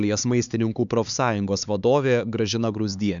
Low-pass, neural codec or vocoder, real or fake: 7.2 kHz; codec, 16 kHz, 4 kbps, X-Codec, HuBERT features, trained on LibriSpeech; fake